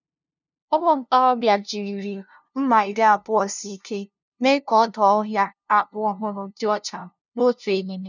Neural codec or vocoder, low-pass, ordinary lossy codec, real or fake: codec, 16 kHz, 0.5 kbps, FunCodec, trained on LibriTTS, 25 frames a second; 7.2 kHz; none; fake